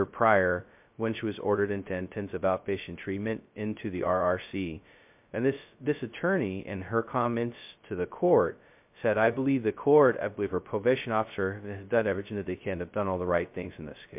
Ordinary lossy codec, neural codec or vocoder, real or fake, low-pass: MP3, 32 kbps; codec, 16 kHz, 0.2 kbps, FocalCodec; fake; 3.6 kHz